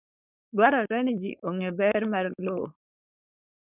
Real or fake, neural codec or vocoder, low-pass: fake; codec, 16 kHz, 4.8 kbps, FACodec; 3.6 kHz